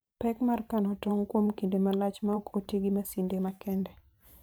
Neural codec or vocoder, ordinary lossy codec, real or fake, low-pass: vocoder, 44.1 kHz, 128 mel bands every 512 samples, BigVGAN v2; none; fake; none